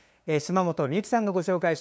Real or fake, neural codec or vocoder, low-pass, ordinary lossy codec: fake; codec, 16 kHz, 2 kbps, FunCodec, trained on LibriTTS, 25 frames a second; none; none